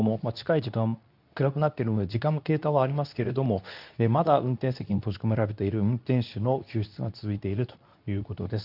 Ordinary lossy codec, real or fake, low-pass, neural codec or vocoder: none; fake; 5.4 kHz; codec, 24 kHz, 0.9 kbps, WavTokenizer, medium speech release version 2